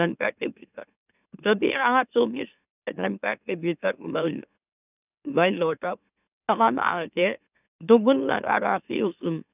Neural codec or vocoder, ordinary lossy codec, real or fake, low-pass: autoencoder, 44.1 kHz, a latent of 192 numbers a frame, MeloTTS; none; fake; 3.6 kHz